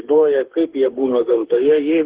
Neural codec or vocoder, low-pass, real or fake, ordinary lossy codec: autoencoder, 48 kHz, 32 numbers a frame, DAC-VAE, trained on Japanese speech; 3.6 kHz; fake; Opus, 16 kbps